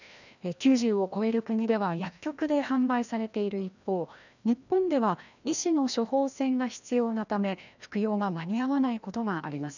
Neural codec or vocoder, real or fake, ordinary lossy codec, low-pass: codec, 16 kHz, 1 kbps, FreqCodec, larger model; fake; none; 7.2 kHz